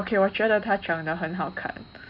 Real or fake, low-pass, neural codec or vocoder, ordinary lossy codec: real; 5.4 kHz; none; none